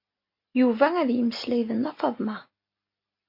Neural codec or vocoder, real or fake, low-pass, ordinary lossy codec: none; real; 5.4 kHz; MP3, 32 kbps